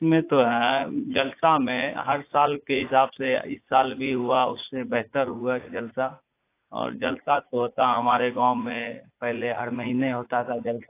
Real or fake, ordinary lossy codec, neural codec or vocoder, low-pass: fake; AAC, 24 kbps; vocoder, 44.1 kHz, 80 mel bands, Vocos; 3.6 kHz